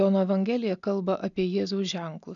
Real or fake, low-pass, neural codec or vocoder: real; 7.2 kHz; none